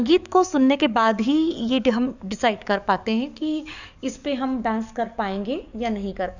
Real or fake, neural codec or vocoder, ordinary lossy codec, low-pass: fake; codec, 44.1 kHz, 7.8 kbps, DAC; none; 7.2 kHz